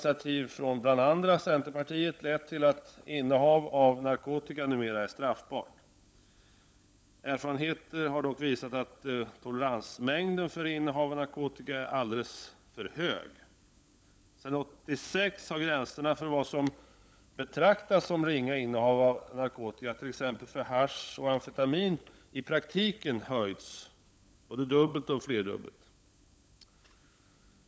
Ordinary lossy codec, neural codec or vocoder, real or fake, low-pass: none; codec, 16 kHz, 16 kbps, FunCodec, trained on LibriTTS, 50 frames a second; fake; none